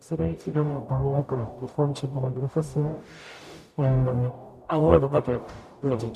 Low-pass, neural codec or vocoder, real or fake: 14.4 kHz; codec, 44.1 kHz, 0.9 kbps, DAC; fake